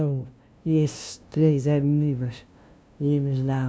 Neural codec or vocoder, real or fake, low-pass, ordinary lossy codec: codec, 16 kHz, 0.5 kbps, FunCodec, trained on LibriTTS, 25 frames a second; fake; none; none